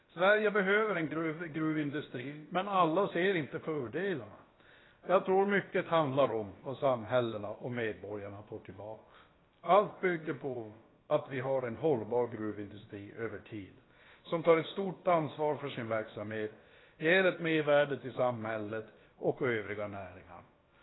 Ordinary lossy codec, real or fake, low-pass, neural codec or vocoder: AAC, 16 kbps; fake; 7.2 kHz; codec, 16 kHz, about 1 kbps, DyCAST, with the encoder's durations